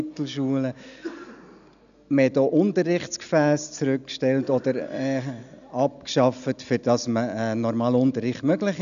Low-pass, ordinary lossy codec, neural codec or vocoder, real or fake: 7.2 kHz; none; none; real